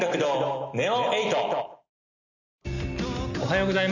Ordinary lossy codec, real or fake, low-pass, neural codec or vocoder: none; real; 7.2 kHz; none